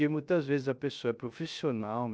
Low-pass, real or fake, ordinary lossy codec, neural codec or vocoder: none; fake; none; codec, 16 kHz, 0.3 kbps, FocalCodec